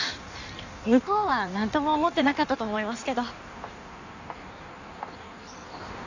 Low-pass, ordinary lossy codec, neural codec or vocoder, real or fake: 7.2 kHz; none; codec, 16 kHz in and 24 kHz out, 1.1 kbps, FireRedTTS-2 codec; fake